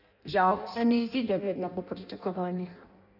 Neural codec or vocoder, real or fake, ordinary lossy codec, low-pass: codec, 16 kHz in and 24 kHz out, 0.6 kbps, FireRedTTS-2 codec; fake; none; 5.4 kHz